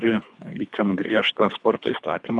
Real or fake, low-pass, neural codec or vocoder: fake; 10.8 kHz; codec, 24 kHz, 3 kbps, HILCodec